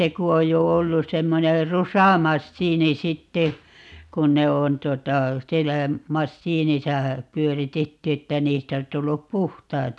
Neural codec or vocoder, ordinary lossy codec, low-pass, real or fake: none; none; none; real